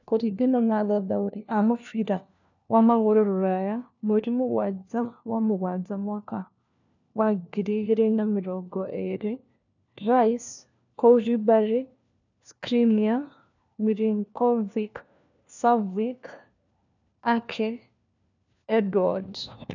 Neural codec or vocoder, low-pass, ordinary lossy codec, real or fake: codec, 16 kHz, 1 kbps, FunCodec, trained on LibriTTS, 50 frames a second; 7.2 kHz; none; fake